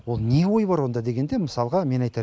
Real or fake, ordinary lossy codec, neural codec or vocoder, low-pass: real; none; none; none